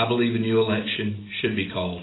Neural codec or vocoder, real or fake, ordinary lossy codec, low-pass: none; real; AAC, 16 kbps; 7.2 kHz